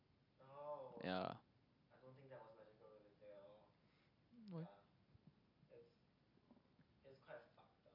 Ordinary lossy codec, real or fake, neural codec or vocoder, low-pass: MP3, 48 kbps; real; none; 5.4 kHz